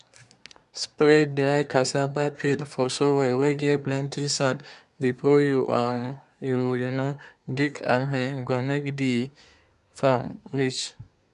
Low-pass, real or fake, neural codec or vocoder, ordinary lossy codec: 10.8 kHz; fake; codec, 24 kHz, 1 kbps, SNAC; none